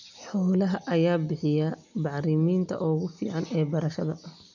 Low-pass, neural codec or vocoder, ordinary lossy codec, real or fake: 7.2 kHz; none; none; real